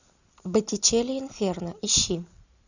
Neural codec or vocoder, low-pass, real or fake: none; 7.2 kHz; real